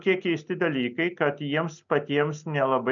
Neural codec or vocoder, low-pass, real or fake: none; 7.2 kHz; real